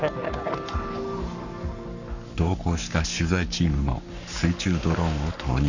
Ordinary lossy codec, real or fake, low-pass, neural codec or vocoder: none; fake; 7.2 kHz; codec, 44.1 kHz, 7.8 kbps, Pupu-Codec